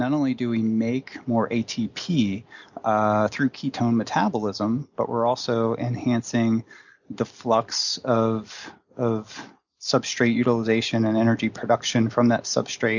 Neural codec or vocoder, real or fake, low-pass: none; real; 7.2 kHz